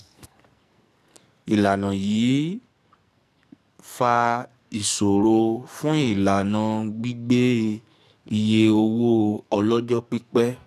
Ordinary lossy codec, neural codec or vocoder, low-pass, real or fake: none; codec, 44.1 kHz, 2.6 kbps, SNAC; 14.4 kHz; fake